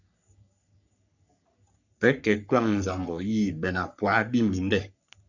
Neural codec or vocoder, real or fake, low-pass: codec, 44.1 kHz, 3.4 kbps, Pupu-Codec; fake; 7.2 kHz